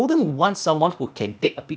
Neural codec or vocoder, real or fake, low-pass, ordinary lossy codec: codec, 16 kHz, 0.8 kbps, ZipCodec; fake; none; none